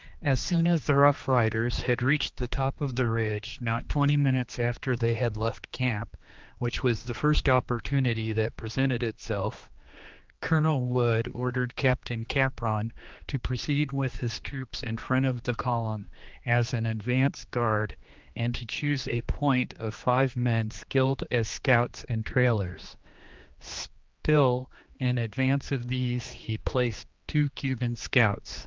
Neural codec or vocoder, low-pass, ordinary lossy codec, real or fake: codec, 16 kHz, 2 kbps, X-Codec, HuBERT features, trained on general audio; 7.2 kHz; Opus, 24 kbps; fake